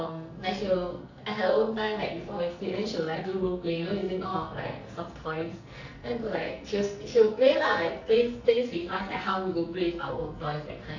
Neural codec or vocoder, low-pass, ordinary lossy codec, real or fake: codec, 44.1 kHz, 2.6 kbps, SNAC; 7.2 kHz; none; fake